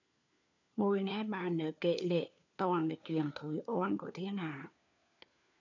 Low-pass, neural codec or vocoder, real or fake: 7.2 kHz; codec, 16 kHz, 4 kbps, FunCodec, trained on LibriTTS, 50 frames a second; fake